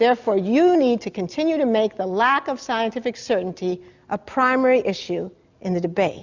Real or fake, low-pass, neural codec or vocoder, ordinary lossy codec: real; 7.2 kHz; none; Opus, 64 kbps